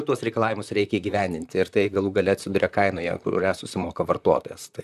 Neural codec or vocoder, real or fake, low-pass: vocoder, 44.1 kHz, 128 mel bands, Pupu-Vocoder; fake; 14.4 kHz